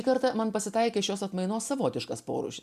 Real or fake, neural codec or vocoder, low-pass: real; none; 14.4 kHz